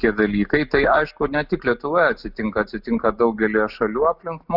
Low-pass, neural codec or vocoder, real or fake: 5.4 kHz; none; real